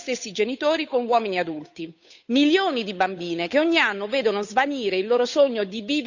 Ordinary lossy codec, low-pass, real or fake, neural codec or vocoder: none; 7.2 kHz; fake; codec, 16 kHz, 8 kbps, FunCodec, trained on Chinese and English, 25 frames a second